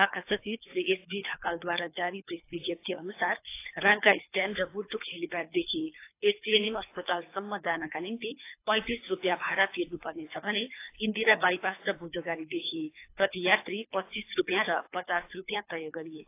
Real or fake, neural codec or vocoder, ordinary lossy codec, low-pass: fake; codec, 24 kHz, 3 kbps, HILCodec; AAC, 24 kbps; 3.6 kHz